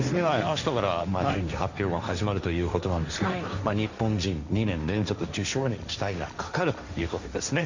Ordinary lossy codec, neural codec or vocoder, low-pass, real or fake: Opus, 64 kbps; codec, 16 kHz, 1.1 kbps, Voila-Tokenizer; 7.2 kHz; fake